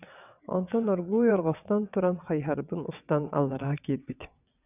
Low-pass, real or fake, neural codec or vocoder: 3.6 kHz; fake; vocoder, 22.05 kHz, 80 mel bands, WaveNeXt